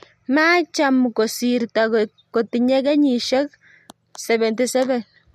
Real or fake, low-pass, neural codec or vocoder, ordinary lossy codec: real; 14.4 kHz; none; MP3, 64 kbps